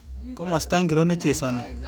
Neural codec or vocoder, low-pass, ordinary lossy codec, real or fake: codec, 44.1 kHz, 2.6 kbps, DAC; none; none; fake